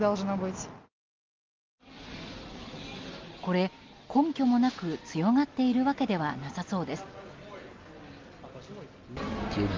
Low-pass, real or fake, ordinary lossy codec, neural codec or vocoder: 7.2 kHz; real; Opus, 32 kbps; none